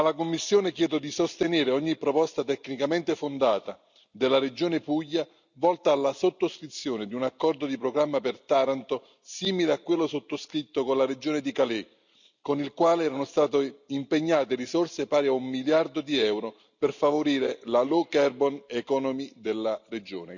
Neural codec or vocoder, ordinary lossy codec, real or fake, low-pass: none; none; real; 7.2 kHz